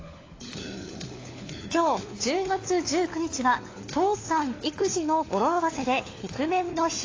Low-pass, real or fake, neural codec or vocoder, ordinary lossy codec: 7.2 kHz; fake; codec, 16 kHz, 4 kbps, FunCodec, trained on LibriTTS, 50 frames a second; AAC, 32 kbps